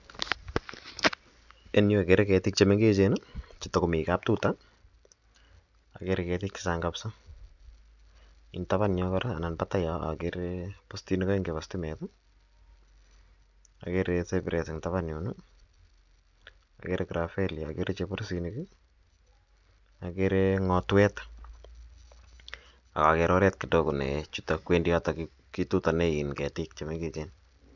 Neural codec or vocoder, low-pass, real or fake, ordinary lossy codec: none; 7.2 kHz; real; none